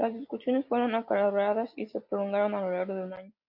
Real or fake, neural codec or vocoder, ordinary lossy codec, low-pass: real; none; AAC, 48 kbps; 5.4 kHz